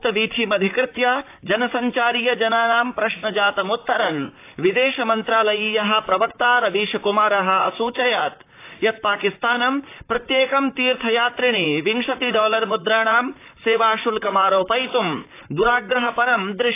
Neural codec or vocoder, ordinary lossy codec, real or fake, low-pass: vocoder, 44.1 kHz, 128 mel bands, Pupu-Vocoder; AAC, 24 kbps; fake; 3.6 kHz